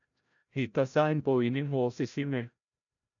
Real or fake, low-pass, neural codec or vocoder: fake; 7.2 kHz; codec, 16 kHz, 0.5 kbps, FreqCodec, larger model